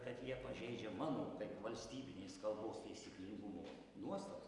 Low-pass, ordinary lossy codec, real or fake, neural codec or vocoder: 10.8 kHz; Opus, 64 kbps; real; none